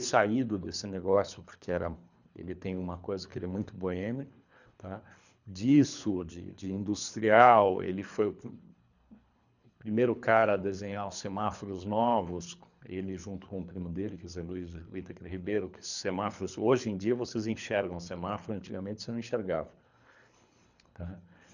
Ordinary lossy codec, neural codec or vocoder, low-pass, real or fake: none; codec, 24 kHz, 3 kbps, HILCodec; 7.2 kHz; fake